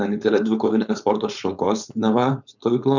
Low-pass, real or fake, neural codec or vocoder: 7.2 kHz; fake; codec, 16 kHz, 4.8 kbps, FACodec